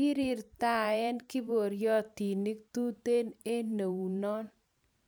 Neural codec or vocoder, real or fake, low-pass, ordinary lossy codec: vocoder, 44.1 kHz, 128 mel bands every 512 samples, BigVGAN v2; fake; none; none